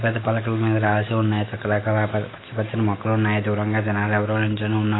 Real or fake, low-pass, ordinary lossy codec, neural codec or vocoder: real; 7.2 kHz; AAC, 16 kbps; none